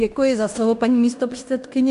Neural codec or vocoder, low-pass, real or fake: codec, 16 kHz in and 24 kHz out, 0.9 kbps, LongCat-Audio-Codec, fine tuned four codebook decoder; 10.8 kHz; fake